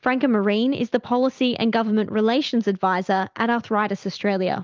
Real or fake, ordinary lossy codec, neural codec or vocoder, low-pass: real; Opus, 24 kbps; none; 7.2 kHz